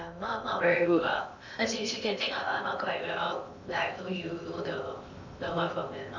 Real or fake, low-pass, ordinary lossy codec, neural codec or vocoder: fake; 7.2 kHz; none; codec, 16 kHz in and 24 kHz out, 0.6 kbps, FocalCodec, streaming, 2048 codes